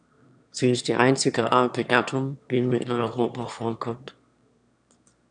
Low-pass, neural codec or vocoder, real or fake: 9.9 kHz; autoencoder, 22.05 kHz, a latent of 192 numbers a frame, VITS, trained on one speaker; fake